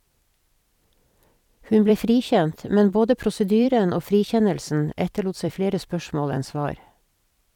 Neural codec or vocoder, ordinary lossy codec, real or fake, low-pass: vocoder, 44.1 kHz, 128 mel bands every 256 samples, BigVGAN v2; none; fake; 19.8 kHz